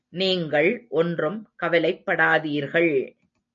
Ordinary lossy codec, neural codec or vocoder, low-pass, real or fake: AAC, 64 kbps; none; 7.2 kHz; real